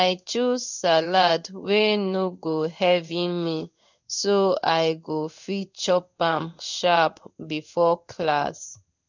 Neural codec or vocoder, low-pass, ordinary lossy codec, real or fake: codec, 16 kHz in and 24 kHz out, 1 kbps, XY-Tokenizer; 7.2 kHz; none; fake